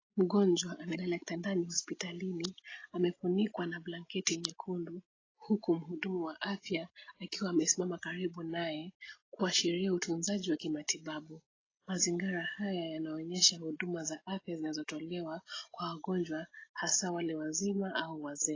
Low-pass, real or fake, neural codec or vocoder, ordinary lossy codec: 7.2 kHz; real; none; AAC, 32 kbps